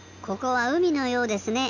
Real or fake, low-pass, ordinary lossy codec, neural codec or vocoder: real; 7.2 kHz; none; none